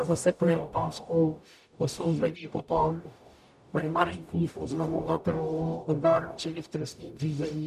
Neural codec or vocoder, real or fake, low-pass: codec, 44.1 kHz, 0.9 kbps, DAC; fake; 14.4 kHz